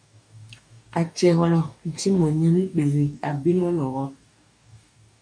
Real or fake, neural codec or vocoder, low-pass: fake; codec, 44.1 kHz, 2.6 kbps, DAC; 9.9 kHz